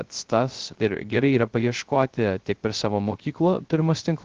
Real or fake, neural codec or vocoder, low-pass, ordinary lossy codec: fake; codec, 16 kHz, 0.3 kbps, FocalCodec; 7.2 kHz; Opus, 16 kbps